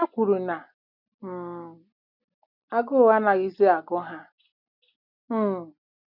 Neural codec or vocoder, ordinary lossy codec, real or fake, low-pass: none; none; real; 5.4 kHz